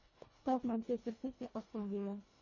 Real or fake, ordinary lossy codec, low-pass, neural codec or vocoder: fake; MP3, 32 kbps; 7.2 kHz; codec, 24 kHz, 1.5 kbps, HILCodec